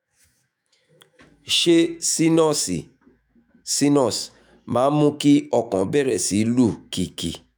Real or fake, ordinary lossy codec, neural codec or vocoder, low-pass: fake; none; autoencoder, 48 kHz, 128 numbers a frame, DAC-VAE, trained on Japanese speech; none